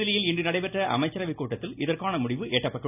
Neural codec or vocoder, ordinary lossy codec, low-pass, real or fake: none; none; 3.6 kHz; real